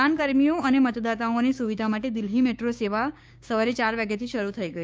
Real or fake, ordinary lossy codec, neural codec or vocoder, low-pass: fake; none; codec, 16 kHz, 6 kbps, DAC; none